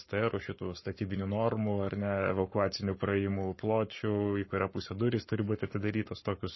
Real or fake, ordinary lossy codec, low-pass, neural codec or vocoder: fake; MP3, 24 kbps; 7.2 kHz; codec, 44.1 kHz, 7.8 kbps, Pupu-Codec